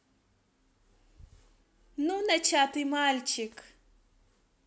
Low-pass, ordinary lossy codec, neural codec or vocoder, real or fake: none; none; none; real